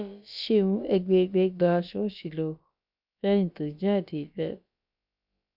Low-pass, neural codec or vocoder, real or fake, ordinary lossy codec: 5.4 kHz; codec, 16 kHz, about 1 kbps, DyCAST, with the encoder's durations; fake; none